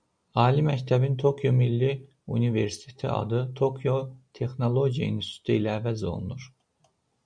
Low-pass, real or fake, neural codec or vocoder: 9.9 kHz; real; none